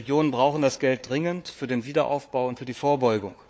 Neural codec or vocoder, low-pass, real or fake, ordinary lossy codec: codec, 16 kHz, 4 kbps, FunCodec, trained on Chinese and English, 50 frames a second; none; fake; none